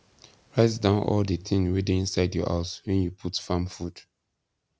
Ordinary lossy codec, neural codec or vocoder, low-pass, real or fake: none; none; none; real